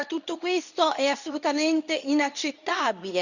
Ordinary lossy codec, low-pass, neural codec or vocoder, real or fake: none; 7.2 kHz; codec, 24 kHz, 0.9 kbps, WavTokenizer, medium speech release version 2; fake